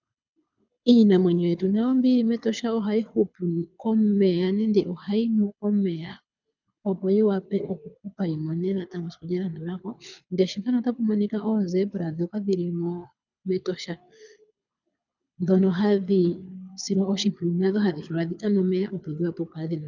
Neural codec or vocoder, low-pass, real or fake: codec, 24 kHz, 6 kbps, HILCodec; 7.2 kHz; fake